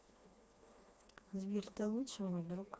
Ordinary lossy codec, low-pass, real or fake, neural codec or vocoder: none; none; fake; codec, 16 kHz, 2 kbps, FreqCodec, smaller model